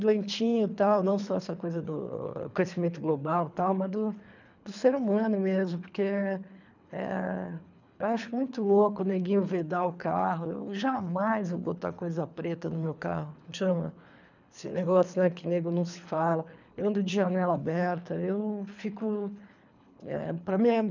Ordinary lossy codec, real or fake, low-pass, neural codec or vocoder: none; fake; 7.2 kHz; codec, 24 kHz, 3 kbps, HILCodec